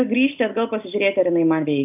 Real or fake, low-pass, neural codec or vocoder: real; 3.6 kHz; none